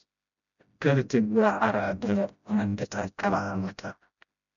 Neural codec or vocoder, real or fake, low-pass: codec, 16 kHz, 0.5 kbps, FreqCodec, smaller model; fake; 7.2 kHz